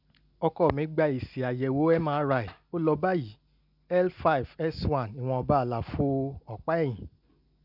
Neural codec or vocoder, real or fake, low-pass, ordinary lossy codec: none; real; 5.4 kHz; none